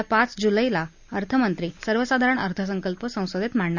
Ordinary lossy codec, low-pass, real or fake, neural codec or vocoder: none; 7.2 kHz; real; none